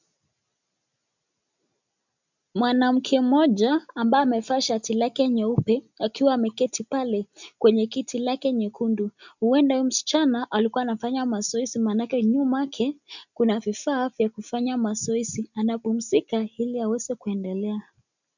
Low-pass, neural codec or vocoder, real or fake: 7.2 kHz; none; real